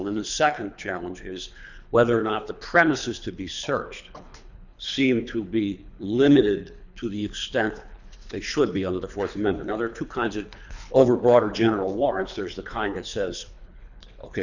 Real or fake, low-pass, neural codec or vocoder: fake; 7.2 kHz; codec, 24 kHz, 3 kbps, HILCodec